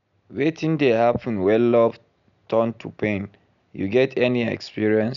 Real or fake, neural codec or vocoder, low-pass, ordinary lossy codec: real; none; 7.2 kHz; none